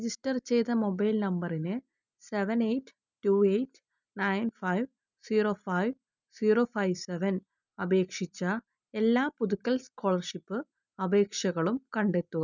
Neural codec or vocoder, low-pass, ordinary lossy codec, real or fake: none; 7.2 kHz; none; real